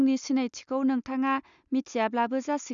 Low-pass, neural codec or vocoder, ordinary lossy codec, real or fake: 7.2 kHz; none; none; real